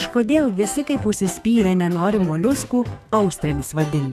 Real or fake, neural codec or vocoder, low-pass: fake; codec, 32 kHz, 1.9 kbps, SNAC; 14.4 kHz